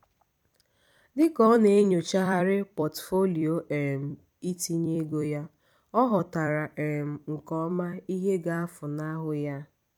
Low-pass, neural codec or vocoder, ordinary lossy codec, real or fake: 19.8 kHz; vocoder, 44.1 kHz, 128 mel bands every 256 samples, BigVGAN v2; none; fake